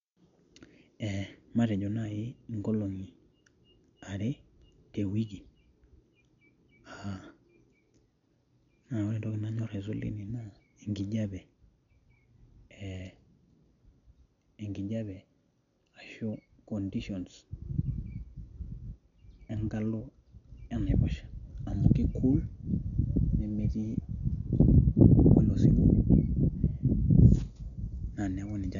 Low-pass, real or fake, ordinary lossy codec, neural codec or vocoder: 7.2 kHz; real; none; none